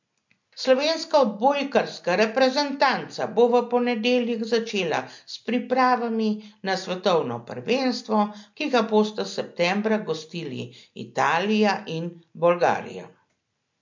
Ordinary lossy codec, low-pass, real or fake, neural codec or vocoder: MP3, 48 kbps; 7.2 kHz; real; none